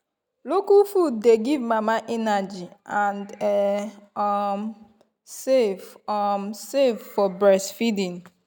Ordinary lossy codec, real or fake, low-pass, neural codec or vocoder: none; real; none; none